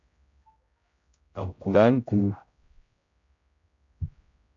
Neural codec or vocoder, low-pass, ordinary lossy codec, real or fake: codec, 16 kHz, 0.5 kbps, X-Codec, HuBERT features, trained on general audio; 7.2 kHz; MP3, 64 kbps; fake